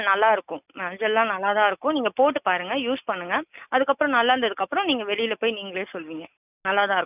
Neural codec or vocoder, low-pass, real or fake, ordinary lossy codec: none; 3.6 kHz; real; none